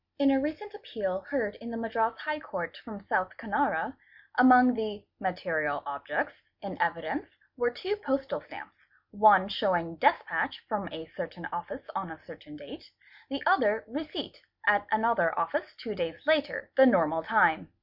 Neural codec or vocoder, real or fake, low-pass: none; real; 5.4 kHz